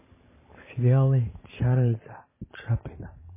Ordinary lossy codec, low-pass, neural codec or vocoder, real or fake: MP3, 16 kbps; 3.6 kHz; vocoder, 44.1 kHz, 128 mel bands every 512 samples, BigVGAN v2; fake